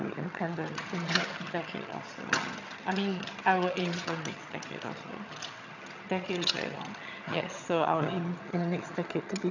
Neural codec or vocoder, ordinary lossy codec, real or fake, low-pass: vocoder, 22.05 kHz, 80 mel bands, HiFi-GAN; none; fake; 7.2 kHz